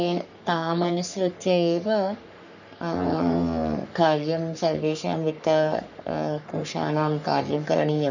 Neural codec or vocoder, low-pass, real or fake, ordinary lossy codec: codec, 44.1 kHz, 3.4 kbps, Pupu-Codec; 7.2 kHz; fake; none